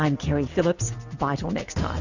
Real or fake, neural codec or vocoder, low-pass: real; none; 7.2 kHz